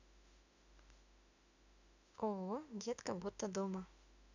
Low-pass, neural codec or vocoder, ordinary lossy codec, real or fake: 7.2 kHz; autoencoder, 48 kHz, 32 numbers a frame, DAC-VAE, trained on Japanese speech; none; fake